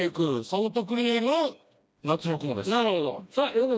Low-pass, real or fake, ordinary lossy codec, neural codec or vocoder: none; fake; none; codec, 16 kHz, 1 kbps, FreqCodec, smaller model